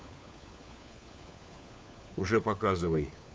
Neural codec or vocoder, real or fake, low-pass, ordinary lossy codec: codec, 16 kHz, 4 kbps, FunCodec, trained on LibriTTS, 50 frames a second; fake; none; none